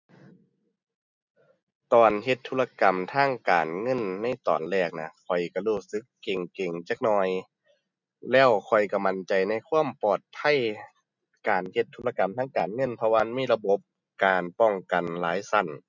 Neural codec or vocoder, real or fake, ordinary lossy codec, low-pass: none; real; none; 7.2 kHz